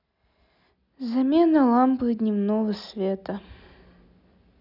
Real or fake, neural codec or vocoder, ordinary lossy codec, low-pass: real; none; none; 5.4 kHz